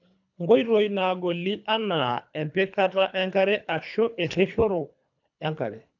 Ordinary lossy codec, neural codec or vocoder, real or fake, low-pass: none; codec, 24 kHz, 3 kbps, HILCodec; fake; 7.2 kHz